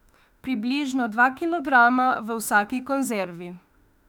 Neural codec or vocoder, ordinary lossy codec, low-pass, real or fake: autoencoder, 48 kHz, 32 numbers a frame, DAC-VAE, trained on Japanese speech; none; 19.8 kHz; fake